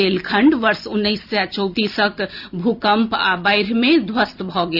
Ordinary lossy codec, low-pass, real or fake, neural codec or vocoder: Opus, 64 kbps; 5.4 kHz; real; none